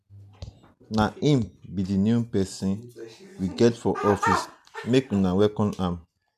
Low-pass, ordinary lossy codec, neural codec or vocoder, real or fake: 14.4 kHz; none; none; real